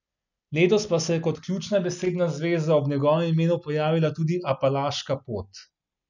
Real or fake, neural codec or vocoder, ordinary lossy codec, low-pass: real; none; none; 7.2 kHz